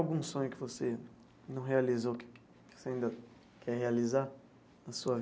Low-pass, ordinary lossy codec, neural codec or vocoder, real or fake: none; none; none; real